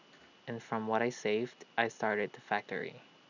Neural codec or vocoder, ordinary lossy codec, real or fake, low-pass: none; none; real; 7.2 kHz